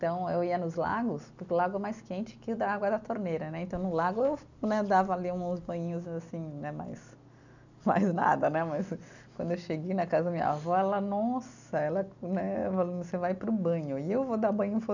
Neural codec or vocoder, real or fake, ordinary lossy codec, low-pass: none; real; none; 7.2 kHz